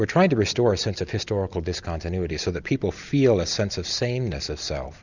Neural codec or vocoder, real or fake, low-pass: none; real; 7.2 kHz